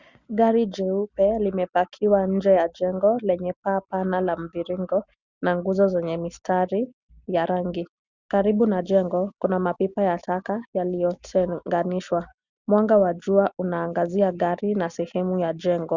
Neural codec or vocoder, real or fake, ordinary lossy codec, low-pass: none; real; Opus, 64 kbps; 7.2 kHz